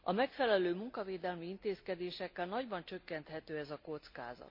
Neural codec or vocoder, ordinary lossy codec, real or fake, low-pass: none; MP3, 32 kbps; real; 5.4 kHz